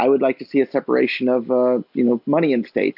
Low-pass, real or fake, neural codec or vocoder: 5.4 kHz; real; none